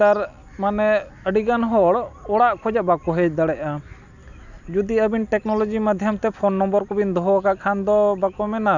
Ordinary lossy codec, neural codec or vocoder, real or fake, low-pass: none; none; real; 7.2 kHz